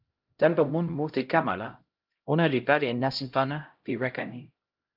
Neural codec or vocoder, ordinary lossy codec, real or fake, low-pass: codec, 16 kHz, 0.5 kbps, X-Codec, HuBERT features, trained on LibriSpeech; Opus, 32 kbps; fake; 5.4 kHz